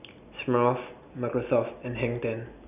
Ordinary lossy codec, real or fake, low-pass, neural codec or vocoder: none; real; 3.6 kHz; none